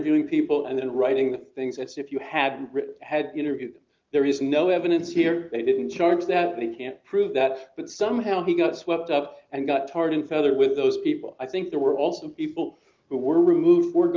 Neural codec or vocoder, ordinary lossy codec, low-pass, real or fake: none; Opus, 24 kbps; 7.2 kHz; real